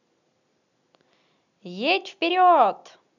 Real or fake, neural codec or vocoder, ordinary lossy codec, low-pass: real; none; none; 7.2 kHz